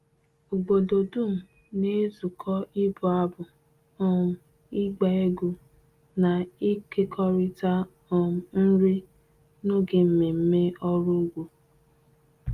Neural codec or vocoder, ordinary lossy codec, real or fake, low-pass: none; Opus, 32 kbps; real; 14.4 kHz